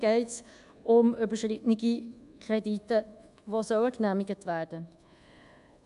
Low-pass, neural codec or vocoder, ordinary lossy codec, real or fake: 10.8 kHz; codec, 24 kHz, 1.2 kbps, DualCodec; none; fake